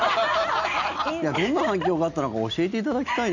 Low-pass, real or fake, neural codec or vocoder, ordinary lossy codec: 7.2 kHz; real; none; none